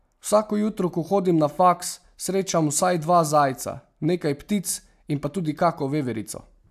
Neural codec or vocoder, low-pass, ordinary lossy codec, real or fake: none; 14.4 kHz; AAC, 96 kbps; real